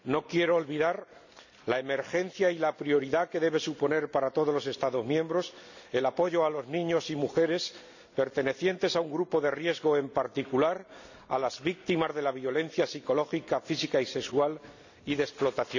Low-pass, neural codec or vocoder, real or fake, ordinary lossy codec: 7.2 kHz; none; real; none